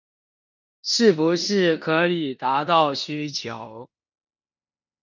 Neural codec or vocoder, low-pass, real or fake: codec, 16 kHz in and 24 kHz out, 0.9 kbps, LongCat-Audio-Codec, four codebook decoder; 7.2 kHz; fake